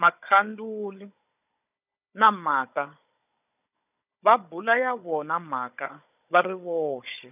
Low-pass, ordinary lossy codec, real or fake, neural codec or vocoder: 3.6 kHz; none; fake; codec, 16 kHz, 16 kbps, FunCodec, trained on Chinese and English, 50 frames a second